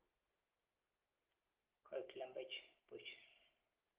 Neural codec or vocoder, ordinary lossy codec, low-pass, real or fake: none; Opus, 16 kbps; 3.6 kHz; real